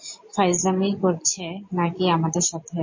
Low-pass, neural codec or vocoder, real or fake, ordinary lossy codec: 7.2 kHz; none; real; MP3, 32 kbps